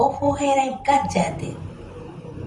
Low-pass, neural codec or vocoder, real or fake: 10.8 kHz; vocoder, 44.1 kHz, 128 mel bands, Pupu-Vocoder; fake